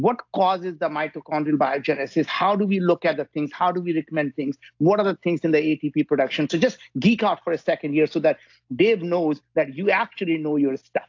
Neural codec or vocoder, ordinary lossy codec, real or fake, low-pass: none; AAC, 48 kbps; real; 7.2 kHz